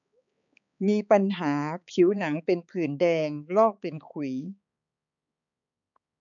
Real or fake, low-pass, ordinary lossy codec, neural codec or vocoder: fake; 7.2 kHz; none; codec, 16 kHz, 4 kbps, X-Codec, HuBERT features, trained on balanced general audio